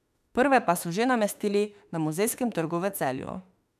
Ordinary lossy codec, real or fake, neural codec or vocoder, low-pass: none; fake; autoencoder, 48 kHz, 32 numbers a frame, DAC-VAE, trained on Japanese speech; 14.4 kHz